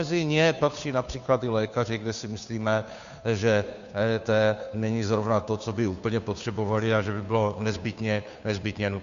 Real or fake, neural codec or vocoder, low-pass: fake; codec, 16 kHz, 2 kbps, FunCodec, trained on Chinese and English, 25 frames a second; 7.2 kHz